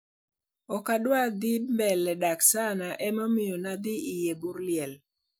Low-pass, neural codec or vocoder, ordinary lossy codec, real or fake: none; none; none; real